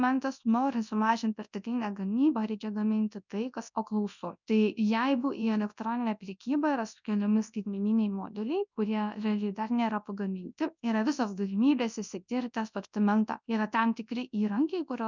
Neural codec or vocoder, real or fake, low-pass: codec, 24 kHz, 0.9 kbps, WavTokenizer, large speech release; fake; 7.2 kHz